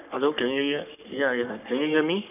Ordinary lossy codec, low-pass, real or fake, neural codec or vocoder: none; 3.6 kHz; fake; codec, 44.1 kHz, 3.4 kbps, Pupu-Codec